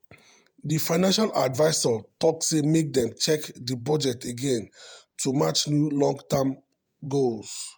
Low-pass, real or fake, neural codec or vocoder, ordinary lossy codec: none; fake; vocoder, 48 kHz, 128 mel bands, Vocos; none